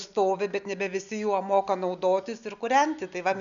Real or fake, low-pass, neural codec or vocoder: real; 7.2 kHz; none